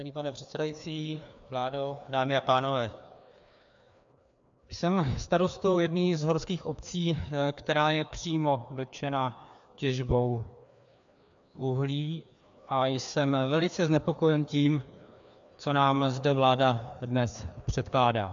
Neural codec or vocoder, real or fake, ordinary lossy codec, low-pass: codec, 16 kHz, 2 kbps, FreqCodec, larger model; fake; AAC, 64 kbps; 7.2 kHz